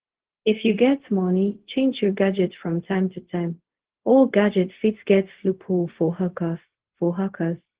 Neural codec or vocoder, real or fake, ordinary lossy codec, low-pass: codec, 16 kHz, 0.4 kbps, LongCat-Audio-Codec; fake; Opus, 16 kbps; 3.6 kHz